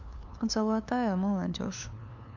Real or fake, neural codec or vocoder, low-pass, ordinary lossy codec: fake; codec, 16 kHz, 2 kbps, FunCodec, trained on LibriTTS, 25 frames a second; 7.2 kHz; MP3, 64 kbps